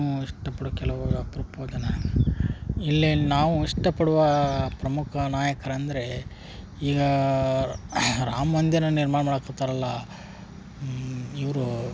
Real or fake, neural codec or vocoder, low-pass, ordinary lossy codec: real; none; none; none